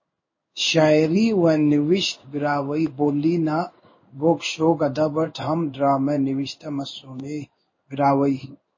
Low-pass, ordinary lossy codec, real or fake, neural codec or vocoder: 7.2 kHz; MP3, 32 kbps; fake; codec, 16 kHz in and 24 kHz out, 1 kbps, XY-Tokenizer